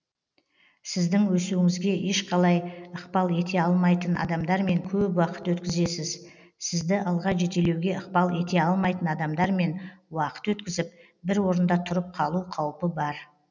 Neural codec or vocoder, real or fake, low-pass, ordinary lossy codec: none; real; 7.2 kHz; none